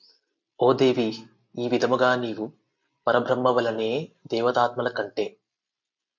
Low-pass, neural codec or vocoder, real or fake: 7.2 kHz; none; real